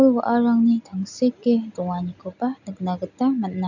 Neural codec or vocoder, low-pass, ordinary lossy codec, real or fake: none; 7.2 kHz; none; real